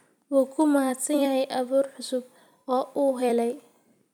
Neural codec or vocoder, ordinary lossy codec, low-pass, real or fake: vocoder, 44.1 kHz, 128 mel bands every 256 samples, BigVGAN v2; none; 19.8 kHz; fake